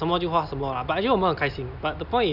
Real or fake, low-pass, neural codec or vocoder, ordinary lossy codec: real; 5.4 kHz; none; none